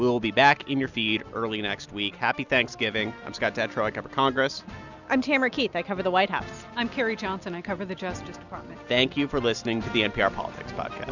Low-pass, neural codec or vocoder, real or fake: 7.2 kHz; none; real